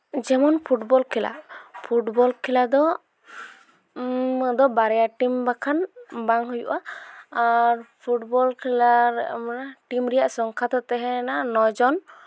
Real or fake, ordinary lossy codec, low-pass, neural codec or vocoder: real; none; none; none